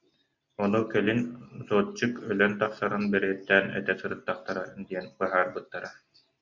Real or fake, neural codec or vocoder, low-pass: real; none; 7.2 kHz